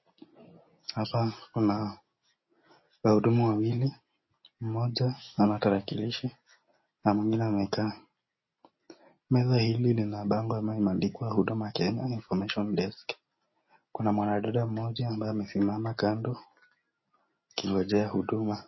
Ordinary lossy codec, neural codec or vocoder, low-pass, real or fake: MP3, 24 kbps; none; 7.2 kHz; real